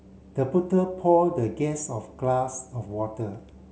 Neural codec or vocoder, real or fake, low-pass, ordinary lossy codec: none; real; none; none